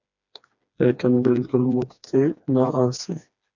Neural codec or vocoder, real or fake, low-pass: codec, 16 kHz, 2 kbps, FreqCodec, smaller model; fake; 7.2 kHz